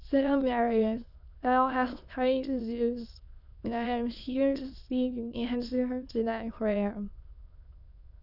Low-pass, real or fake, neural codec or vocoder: 5.4 kHz; fake; autoencoder, 22.05 kHz, a latent of 192 numbers a frame, VITS, trained on many speakers